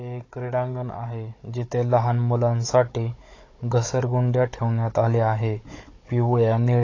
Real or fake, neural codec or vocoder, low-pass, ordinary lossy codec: fake; codec, 16 kHz, 16 kbps, FunCodec, trained on Chinese and English, 50 frames a second; 7.2 kHz; AAC, 32 kbps